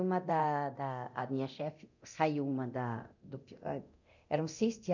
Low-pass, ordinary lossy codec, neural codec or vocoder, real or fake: 7.2 kHz; none; codec, 24 kHz, 0.9 kbps, DualCodec; fake